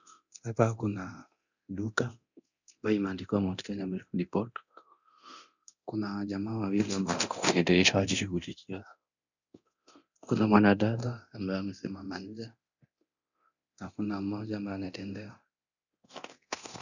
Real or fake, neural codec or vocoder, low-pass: fake; codec, 24 kHz, 0.9 kbps, DualCodec; 7.2 kHz